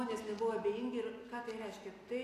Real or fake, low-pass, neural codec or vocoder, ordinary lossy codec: real; 14.4 kHz; none; AAC, 64 kbps